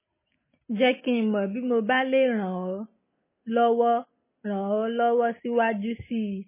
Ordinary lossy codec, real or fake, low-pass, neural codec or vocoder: MP3, 16 kbps; real; 3.6 kHz; none